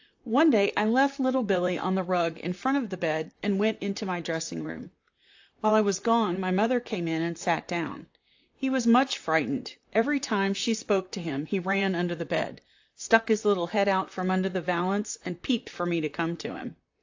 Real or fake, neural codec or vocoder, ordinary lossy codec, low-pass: fake; vocoder, 44.1 kHz, 128 mel bands, Pupu-Vocoder; AAC, 48 kbps; 7.2 kHz